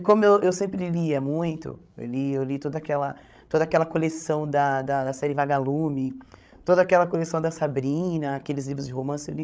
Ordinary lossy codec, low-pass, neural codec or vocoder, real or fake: none; none; codec, 16 kHz, 16 kbps, FreqCodec, larger model; fake